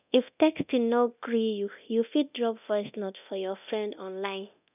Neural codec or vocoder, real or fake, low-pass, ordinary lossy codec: codec, 24 kHz, 0.9 kbps, DualCodec; fake; 3.6 kHz; none